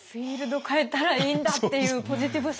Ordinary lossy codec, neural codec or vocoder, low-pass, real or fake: none; none; none; real